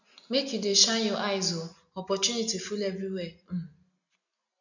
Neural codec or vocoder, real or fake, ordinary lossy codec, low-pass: none; real; none; 7.2 kHz